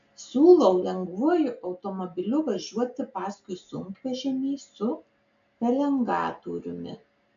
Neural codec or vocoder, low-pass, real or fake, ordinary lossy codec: none; 7.2 kHz; real; AAC, 64 kbps